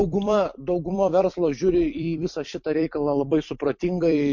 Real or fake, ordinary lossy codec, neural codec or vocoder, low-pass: fake; MP3, 48 kbps; vocoder, 44.1 kHz, 128 mel bands every 256 samples, BigVGAN v2; 7.2 kHz